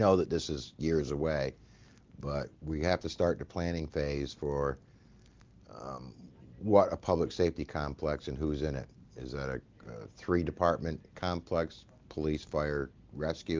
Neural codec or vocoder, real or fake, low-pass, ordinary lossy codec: none; real; 7.2 kHz; Opus, 24 kbps